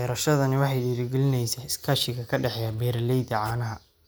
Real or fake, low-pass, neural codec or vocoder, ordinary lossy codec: real; none; none; none